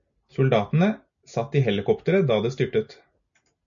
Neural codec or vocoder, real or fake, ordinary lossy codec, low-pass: none; real; MP3, 64 kbps; 7.2 kHz